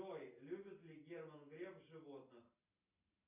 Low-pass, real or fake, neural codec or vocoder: 3.6 kHz; real; none